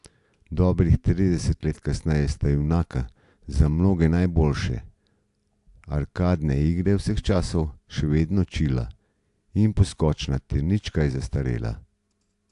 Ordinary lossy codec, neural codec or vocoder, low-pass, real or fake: AAC, 64 kbps; none; 10.8 kHz; real